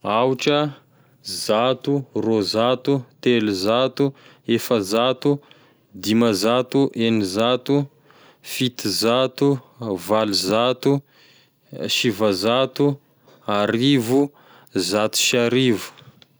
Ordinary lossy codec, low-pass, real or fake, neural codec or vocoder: none; none; real; none